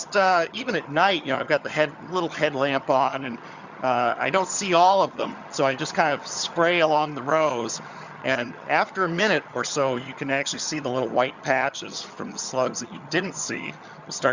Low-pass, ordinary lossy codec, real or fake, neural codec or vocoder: 7.2 kHz; Opus, 64 kbps; fake; vocoder, 22.05 kHz, 80 mel bands, HiFi-GAN